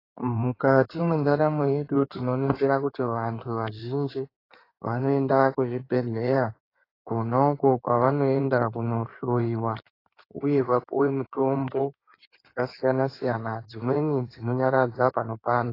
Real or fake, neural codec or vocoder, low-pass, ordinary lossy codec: fake; codec, 16 kHz in and 24 kHz out, 2.2 kbps, FireRedTTS-2 codec; 5.4 kHz; AAC, 24 kbps